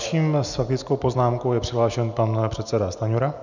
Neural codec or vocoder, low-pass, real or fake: none; 7.2 kHz; real